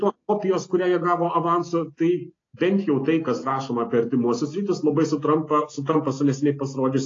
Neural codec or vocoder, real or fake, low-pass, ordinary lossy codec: none; real; 7.2 kHz; AAC, 32 kbps